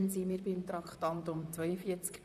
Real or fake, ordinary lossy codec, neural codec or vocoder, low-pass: fake; none; vocoder, 44.1 kHz, 128 mel bands, Pupu-Vocoder; 14.4 kHz